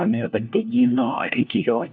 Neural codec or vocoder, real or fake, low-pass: codec, 16 kHz, 1 kbps, FunCodec, trained on LibriTTS, 50 frames a second; fake; 7.2 kHz